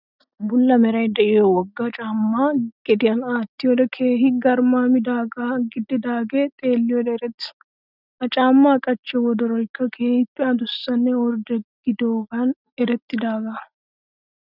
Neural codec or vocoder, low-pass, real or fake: none; 5.4 kHz; real